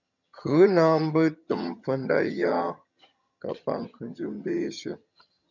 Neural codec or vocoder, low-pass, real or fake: vocoder, 22.05 kHz, 80 mel bands, HiFi-GAN; 7.2 kHz; fake